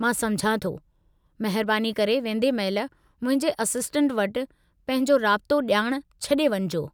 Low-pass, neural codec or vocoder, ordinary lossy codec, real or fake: none; none; none; real